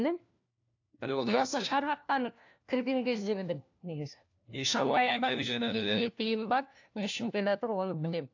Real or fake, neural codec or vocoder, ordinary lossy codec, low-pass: fake; codec, 16 kHz, 1 kbps, FunCodec, trained on LibriTTS, 50 frames a second; AAC, 48 kbps; 7.2 kHz